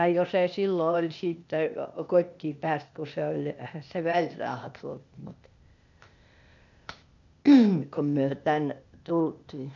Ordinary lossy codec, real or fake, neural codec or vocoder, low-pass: none; fake; codec, 16 kHz, 0.8 kbps, ZipCodec; 7.2 kHz